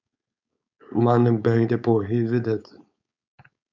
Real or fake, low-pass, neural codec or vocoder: fake; 7.2 kHz; codec, 16 kHz, 4.8 kbps, FACodec